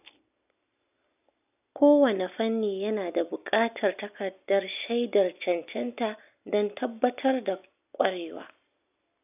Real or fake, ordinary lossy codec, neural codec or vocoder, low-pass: real; none; none; 3.6 kHz